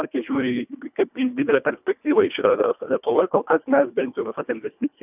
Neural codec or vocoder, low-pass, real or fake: codec, 24 kHz, 1.5 kbps, HILCodec; 3.6 kHz; fake